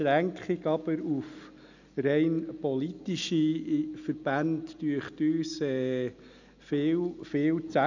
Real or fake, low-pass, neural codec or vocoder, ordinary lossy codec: real; 7.2 kHz; none; none